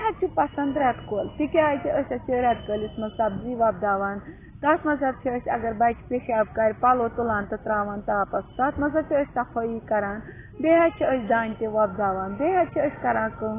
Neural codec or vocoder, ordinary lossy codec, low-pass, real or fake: none; AAC, 16 kbps; 3.6 kHz; real